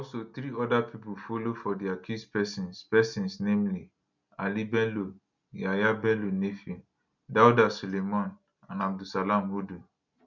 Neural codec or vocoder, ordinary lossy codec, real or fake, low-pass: none; none; real; 7.2 kHz